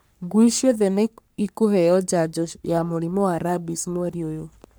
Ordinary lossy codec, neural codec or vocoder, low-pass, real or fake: none; codec, 44.1 kHz, 3.4 kbps, Pupu-Codec; none; fake